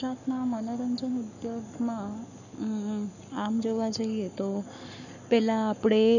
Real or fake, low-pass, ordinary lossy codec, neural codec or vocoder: fake; 7.2 kHz; none; codec, 44.1 kHz, 7.8 kbps, Pupu-Codec